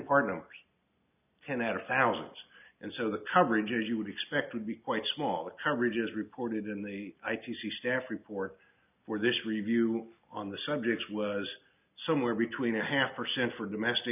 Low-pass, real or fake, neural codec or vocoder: 3.6 kHz; real; none